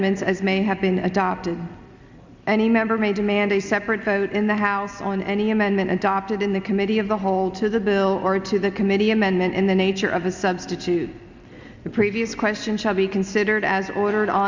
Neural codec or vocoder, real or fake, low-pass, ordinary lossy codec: none; real; 7.2 kHz; Opus, 64 kbps